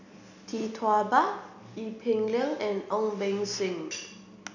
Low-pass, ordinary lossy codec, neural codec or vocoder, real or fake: 7.2 kHz; none; none; real